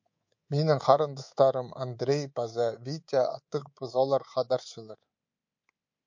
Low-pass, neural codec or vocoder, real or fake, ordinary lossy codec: 7.2 kHz; codec, 24 kHz, 3.1 kbps, DualCodec; fake; MP3, 48 kbps